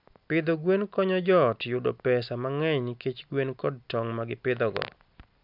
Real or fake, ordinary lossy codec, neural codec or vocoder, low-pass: real; none; none; 5.4 kHz